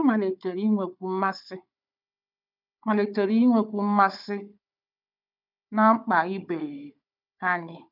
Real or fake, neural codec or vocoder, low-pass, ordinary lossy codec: fake; codec, 16 kHz, 4 kbps, FunCodec, trained on Chinese and English, 50 frames a second; 5.4 kHz; none